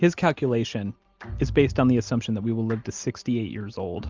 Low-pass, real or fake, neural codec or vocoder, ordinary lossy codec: 7.2 kHz; real; none; Opus, 32 kbps